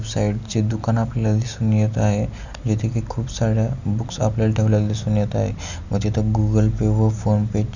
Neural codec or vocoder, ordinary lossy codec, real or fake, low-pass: none; none; real; 7.2 kHz